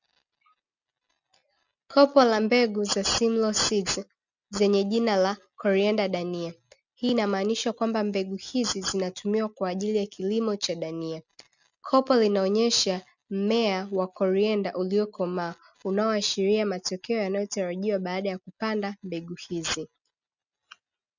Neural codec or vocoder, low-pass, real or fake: none; 7.2 kHz; real